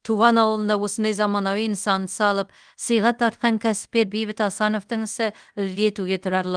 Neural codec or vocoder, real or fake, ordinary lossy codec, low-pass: codec, 24 kHz, 0.5 kbps, DualCodec; fake; Opus, 24 kbps; 9.9 kHz